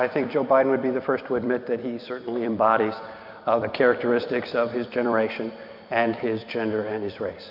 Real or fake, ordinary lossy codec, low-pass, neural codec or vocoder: fake; MP3, 48 kbps; 5.4 kHz; vocoder, 44.1 kHz, 128 mel bands every 256 samples, BigVGAN v2